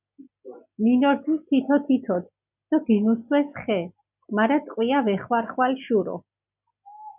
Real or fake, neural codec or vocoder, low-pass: real; none; 3.6 kHz